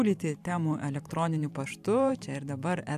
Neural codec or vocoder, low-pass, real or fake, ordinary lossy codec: vocoder, 44.1 kHz, 128 mel bands every 512 samples, BigVGAN v2; 14.4 kHz; fake; AAC, 96 kbps